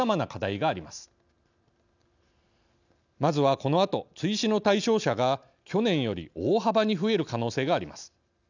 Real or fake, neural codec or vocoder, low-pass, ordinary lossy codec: real; none; 7.2 kHz; none